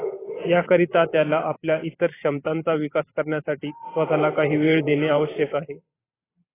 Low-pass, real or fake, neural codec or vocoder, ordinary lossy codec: 3.6 kHz; fake; vocoder, 44.1 kHz, 128 mel bands every 512 samples, BigVGAN v2; AAC, 16 kbps